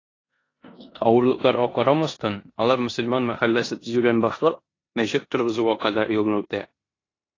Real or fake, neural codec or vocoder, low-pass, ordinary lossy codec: fake; codec, 16 kHz in and 24 kHz out, 0.9 kbps, LongCat-Audio-Codec, four codebook decoder; 7.2 kHz; AAC, 32 kbps